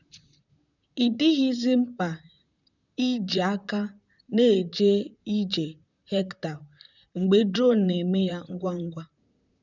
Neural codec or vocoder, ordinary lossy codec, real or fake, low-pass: vocoder, 44.1 kHz, 128 mel bands, Pupu-Vocoder; none; fake; 7.2 kHz